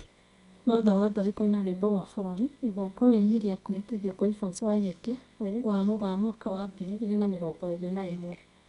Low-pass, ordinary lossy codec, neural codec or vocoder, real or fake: 10.8 kHz; MP3, 96 kbps; codec, 24 kHz, 0.9 kbps, WavTokenizer, medium music audio release; fake